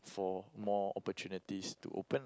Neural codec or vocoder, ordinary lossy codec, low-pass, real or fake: none; none; none; real